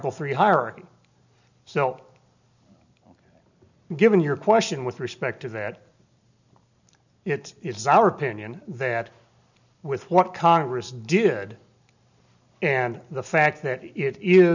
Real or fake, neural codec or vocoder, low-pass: real; none; 7.2 kHz